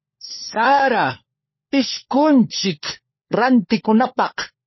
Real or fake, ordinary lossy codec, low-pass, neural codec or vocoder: fake; MP3, 24 kbps; 7.2 kHz; codec, 16 kHz, 4 kbps, FunCodec, trained on LibriTTS, 50 frames a second